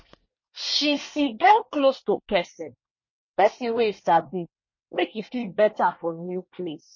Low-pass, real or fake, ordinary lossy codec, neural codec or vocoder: 7.2 kHz; fake; MP3, 32 kbps; codec, 24 kHz, 1 kbps, SNAC